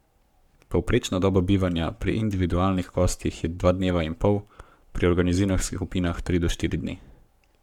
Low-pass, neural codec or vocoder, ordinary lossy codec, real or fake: 19.8 kHz; codec, 44.1 kHz, 7.8 kbps, Pupu-Codec; none; fake